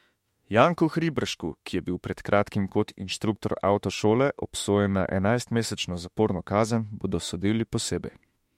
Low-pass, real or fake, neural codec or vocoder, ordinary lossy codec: 19.8 kHz; fake; autoencoder, 48 kHz, 32 numbers a frame, DAC-VAE, trained on Japanese speech; MP3, 64 kbps